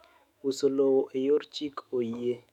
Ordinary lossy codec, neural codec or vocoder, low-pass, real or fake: none; autoencoder, 48 kHz, 128 numbers a frame, DAC-VAE, trained on Japanese speech; 19.8 kHz; fake